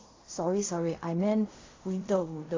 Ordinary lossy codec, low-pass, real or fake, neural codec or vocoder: AAC, 32 kbps; 7.2 kHz; fake; codec, 16 kHz in and 24 kHz out, 0.4 kbps, LongCat-Audio-Codec, fine tuned four codebook decoder